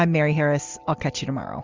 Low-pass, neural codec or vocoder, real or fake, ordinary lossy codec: 7.2 kHz; none; real; Opus, 32 kbps